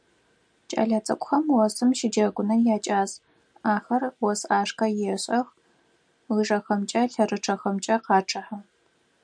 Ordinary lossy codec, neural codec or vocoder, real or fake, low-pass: MP3, 96 kbps; none; real; 9.9 kHz